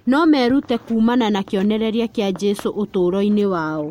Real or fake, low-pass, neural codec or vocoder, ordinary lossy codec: real; 19.8 kHz; none; MP3, 64 kbps